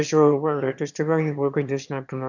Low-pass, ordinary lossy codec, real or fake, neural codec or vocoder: 7.2 kHz; none; fake; autoencoder, 22.05 kHz, a latent of 192 numbers a frame, VITS, trained on one speaker